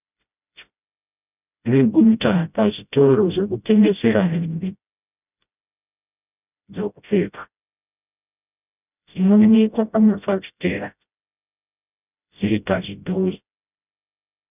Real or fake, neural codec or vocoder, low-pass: fake; codec, 16 kHz, 0.5 kbps, FreqCodec, smaller model; 3.6 kHz